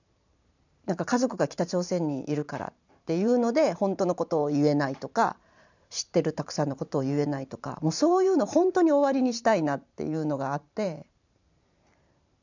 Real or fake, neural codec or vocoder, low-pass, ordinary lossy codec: fake; vocoder, 44.1 kHz, 128 mel bands every 512 samples, BigVGAN v2; 7.2 kHz; none